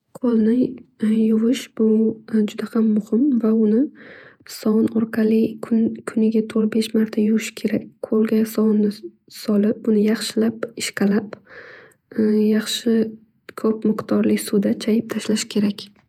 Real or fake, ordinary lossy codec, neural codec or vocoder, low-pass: fake; none; vocoder, 48 kHz, 128 mel bands, Vocos; 19.8 kHz